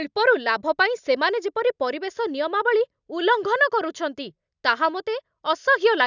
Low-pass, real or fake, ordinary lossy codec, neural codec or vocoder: 7.2 kHz; real; none; none